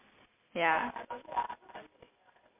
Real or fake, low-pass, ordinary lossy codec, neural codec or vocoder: real; 3.6 kHz; MP3, 32 kbps; none